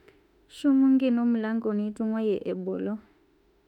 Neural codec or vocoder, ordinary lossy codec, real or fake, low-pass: autoencoder, 48 kHz, 32 numbers a frame, DAC-VAE, trained on Japanese speech; none; fake; 19.8 kHz